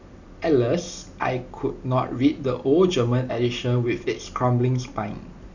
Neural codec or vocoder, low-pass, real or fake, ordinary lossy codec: none; 7.2 kHz; real; none